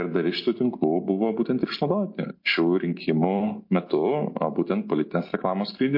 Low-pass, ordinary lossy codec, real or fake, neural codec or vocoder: 5.4 kHz; MP3, 32 kbps; real; none